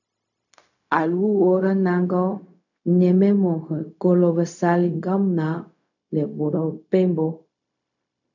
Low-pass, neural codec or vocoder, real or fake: 7.2 kHz; codec, 16 kHz, 0.4 kbps, LongCat-Audio-Codec; fake